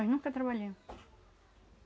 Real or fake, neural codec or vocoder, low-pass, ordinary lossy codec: real; none; none; none